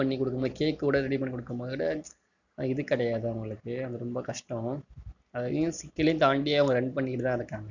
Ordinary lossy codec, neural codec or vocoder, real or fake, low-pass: none; none; real; 7.2 kHz